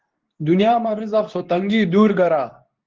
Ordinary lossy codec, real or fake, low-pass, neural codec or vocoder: Opus, 16 kbps; fake; 7.2 kHz; codec, 24 kHz, 0.9 kbps, WavTokenizer, medium speech release version 2